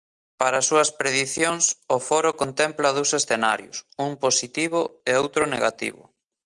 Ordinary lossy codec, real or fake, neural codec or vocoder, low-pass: Opus, 32 kbps; real; none; 10.8 kHz